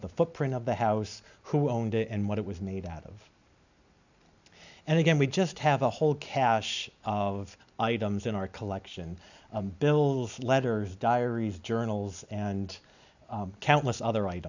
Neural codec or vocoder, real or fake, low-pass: none; real; 7.2 kHz